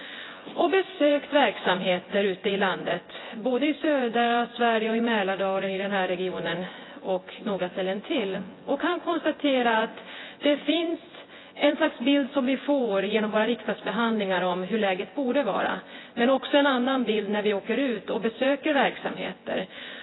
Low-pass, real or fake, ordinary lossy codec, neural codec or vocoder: 7.2 kHz; fake; AAC, 16 kbps; vocoder, 24 kHz, 100 mel bands, Vocos